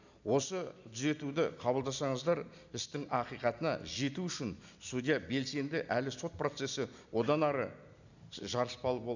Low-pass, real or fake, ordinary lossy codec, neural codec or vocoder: 7.2 kHz; real; none; none